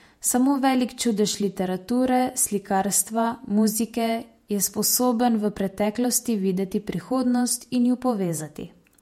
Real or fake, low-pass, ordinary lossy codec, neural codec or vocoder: fake; 19.8 kHz; MP3, 64 kbps; vocoder, 48 kHz, 128 mel bands, Vocos